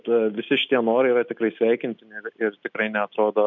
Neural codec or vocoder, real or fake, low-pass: none; real; 7.2 kHz